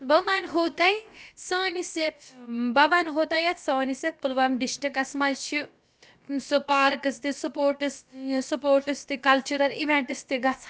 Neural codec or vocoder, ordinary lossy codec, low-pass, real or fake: codec, 16 kHz, about 1 kbps, DyCAST, with the encoder's durations; none; none; fake